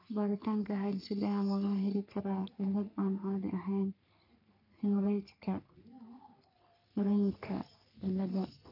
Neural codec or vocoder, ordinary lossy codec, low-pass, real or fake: codec, 44.1 kHz, 3.4 kbps, Pupu-Codec; none; 5.4 kHz; fake